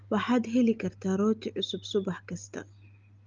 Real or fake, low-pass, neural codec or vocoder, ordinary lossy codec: real; 7.2 kHz; none; Opus, 24 kbps